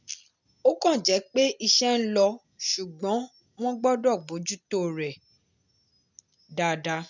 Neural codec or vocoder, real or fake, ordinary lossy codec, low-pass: none; real; none; 7.2 kHz